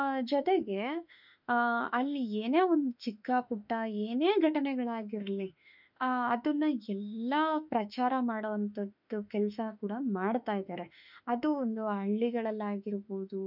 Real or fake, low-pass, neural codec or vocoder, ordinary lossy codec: fake; 5.4 kHz; autoencoder, 48 kHz, 32 numbers a frame, DAC-VAE, trained on Japanese speech; none